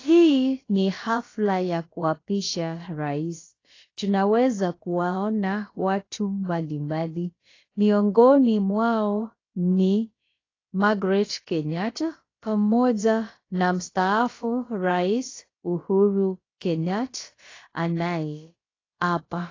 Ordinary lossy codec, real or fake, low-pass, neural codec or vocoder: AAC, 32 kbps; fake; 7.2 kHz; codec, 16 kHz, about 1 kbps, DyCAST, with the encoder's durations